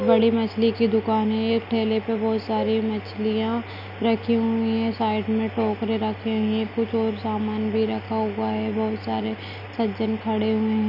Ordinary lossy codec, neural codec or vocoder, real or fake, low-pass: none; none; real; 5.4 kHz